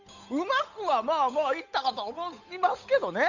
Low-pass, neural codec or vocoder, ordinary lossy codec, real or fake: 7.2 kHz; codec, 16 kHz, 8 kbps, FunCodec, trained on Chinese and English, 25 frames a second; none; fake